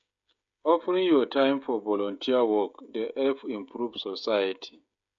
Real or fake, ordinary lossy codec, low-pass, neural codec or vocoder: fake; none; 7.2 kHz; codec, 16 kHz, 16 kbps, FreqCodec, smaller model